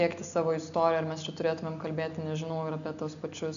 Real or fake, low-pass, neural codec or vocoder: real; 7.2 kHz; none